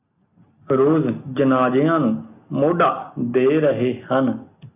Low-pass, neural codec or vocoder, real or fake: 3.6 kHz; none; real